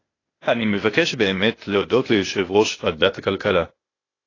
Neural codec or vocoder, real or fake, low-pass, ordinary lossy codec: codec, 16 kHz, 0.8 kbps, ZipCodec; fake; 7.2 kHz; AAC, 32 kbps